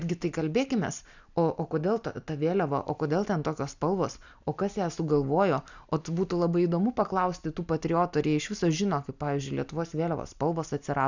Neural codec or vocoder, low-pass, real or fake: none; 7.2 kHz; real